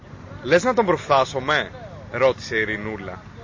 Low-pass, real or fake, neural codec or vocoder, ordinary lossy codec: 7.2 kHz; real; none; MP3, 32 kbps